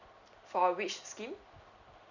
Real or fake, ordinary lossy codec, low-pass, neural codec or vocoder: real; none; 7.2 kHz; none